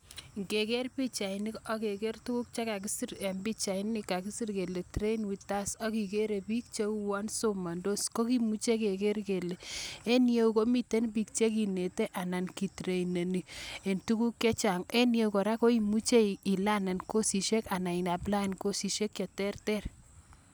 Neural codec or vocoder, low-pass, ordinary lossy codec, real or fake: none; none; none; real